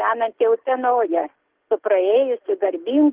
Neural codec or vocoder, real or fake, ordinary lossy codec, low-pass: none; real; Opus, 16 kbps; 3.6 kHz